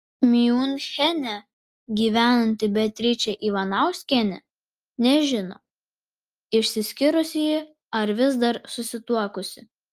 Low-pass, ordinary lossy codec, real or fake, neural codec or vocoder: 14.4 kHz; Opus, 32 kbps; real; none